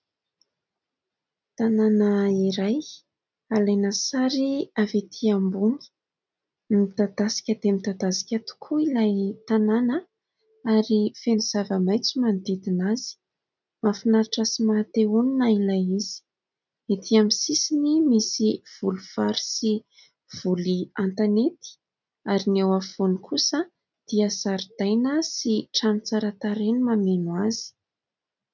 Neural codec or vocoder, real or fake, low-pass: none; real; 7.2 kHz